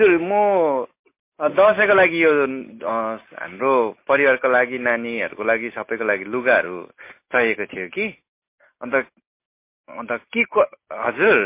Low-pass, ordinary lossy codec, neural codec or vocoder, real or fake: 3.6 kHz; MP3, 24 kbps; none; real